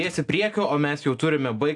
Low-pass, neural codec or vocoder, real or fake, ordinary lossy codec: 10.8 kHz; none; real; AAC, 64 kbps